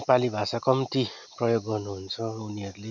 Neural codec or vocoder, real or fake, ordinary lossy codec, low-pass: none; real; none; 7.2 kHz